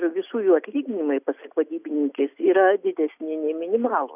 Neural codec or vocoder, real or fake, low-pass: none; real; 3.6 kHz